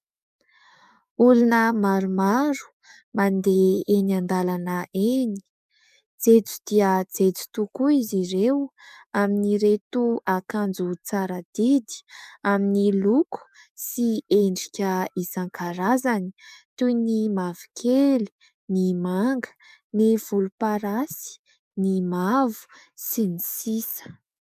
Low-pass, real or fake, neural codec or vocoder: 14.4 kHz; fake; codec, 44.1 kHz, 7.8 kbps, DAC